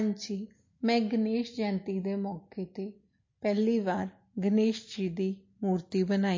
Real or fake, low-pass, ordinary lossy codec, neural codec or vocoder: real; 7.2 kHz; MP3, 32 kbps; none